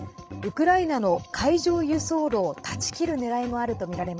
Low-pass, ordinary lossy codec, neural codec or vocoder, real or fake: none; none; codec, 16 kHz, 16 kbps, FreqCodec, larger model; fake